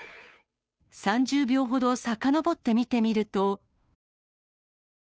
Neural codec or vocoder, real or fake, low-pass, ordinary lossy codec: codec, 16 kHz, 2 kbps, FunCodec, trained on Chinese and English, 25 frames a second; fake; none; none